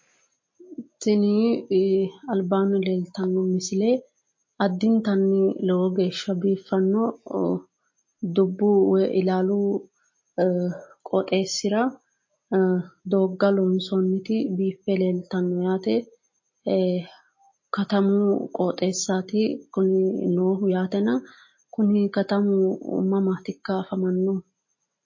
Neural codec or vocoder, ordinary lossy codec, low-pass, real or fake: none; MP3, 32 kbps; 7.2 kHz; real